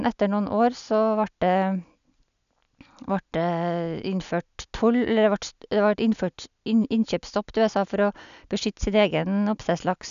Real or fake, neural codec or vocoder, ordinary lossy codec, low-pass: real; none; none; 7.2 kHz